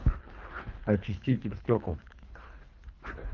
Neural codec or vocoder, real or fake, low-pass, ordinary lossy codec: codec, 24 kHz, 3 kbps, HILCodec; fake; 7.2 kHz; Opus, 32 kbps